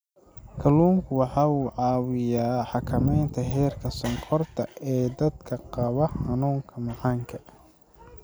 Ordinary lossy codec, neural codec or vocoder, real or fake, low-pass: none; none; real; none